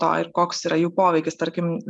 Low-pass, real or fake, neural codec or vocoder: 10.8 kHz; real; none